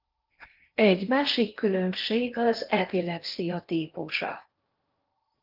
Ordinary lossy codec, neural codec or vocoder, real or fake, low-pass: Opus, 24 kbps; codec, 16 kHz in and 24 kHz out, 0.8 kbps, FocalCodec, streaming, 65536 codes; fake; 5.4 kHz